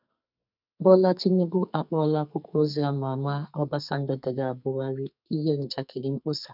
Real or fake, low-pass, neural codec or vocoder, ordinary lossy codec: fake; 5.4 kHz; codec, 44.1 kHz, 2.6 kbps, SNAC; none